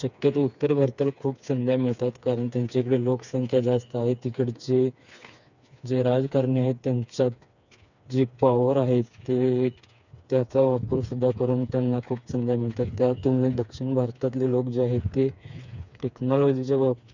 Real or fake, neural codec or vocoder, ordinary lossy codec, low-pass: fake; codec, 16 kHz, 4 kbps, FreqCodec, smaller model; none; 7.2 kHz